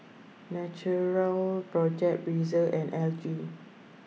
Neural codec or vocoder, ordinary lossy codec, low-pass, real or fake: none; none; none; real